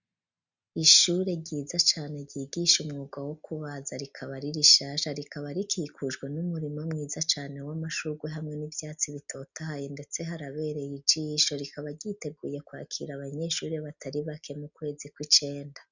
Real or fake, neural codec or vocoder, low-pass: real; none; 7.2 kHz